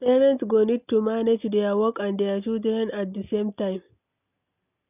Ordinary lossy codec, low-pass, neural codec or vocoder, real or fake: none; 3.6 kHz; none; real